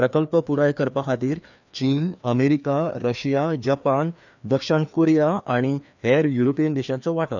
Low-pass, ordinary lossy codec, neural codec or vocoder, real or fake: 7.2 kHz; none; codec, 16 kHz, 2 kbps, FreqCodec, larger model; fake